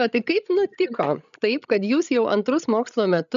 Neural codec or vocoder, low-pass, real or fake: codec, 16 kHz, 16 kbps, FreqCodec, larger model; 7.2 kHz; fake